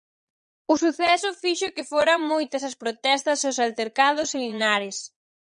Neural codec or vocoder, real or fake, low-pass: vocoder, 22.05 kHz, 80 mel bands, Vocos; fake; 9.9 kHz